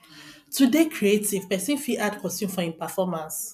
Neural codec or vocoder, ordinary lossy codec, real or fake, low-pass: none; none; real; 14.4 kHz